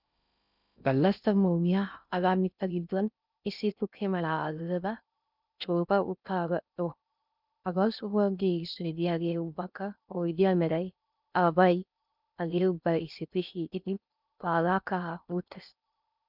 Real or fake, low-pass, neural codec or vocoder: fake; 5.4 kHz; codec, 16 kHz in and 24 kHz out, 0.6 kbps, FocalCodec, streaming, 2048 codes